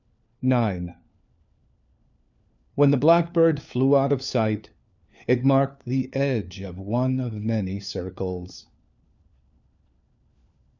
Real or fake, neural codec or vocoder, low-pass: fake; codec, 16 kHz, 4 kbps, FunCodec, trained on LibriTTS, 50 frames a second; 7.2 kHz